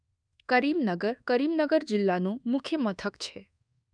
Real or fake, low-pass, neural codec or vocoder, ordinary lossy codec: fake; 9.9 kHz; codec, 24 kHz, 1.2 kbps, DualCodec; none